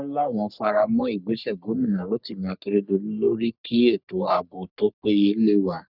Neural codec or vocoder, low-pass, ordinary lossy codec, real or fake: codec, 44.1 kHz, 3.4 kbps, Pupu-Codec; 5.4 kHz; none; fake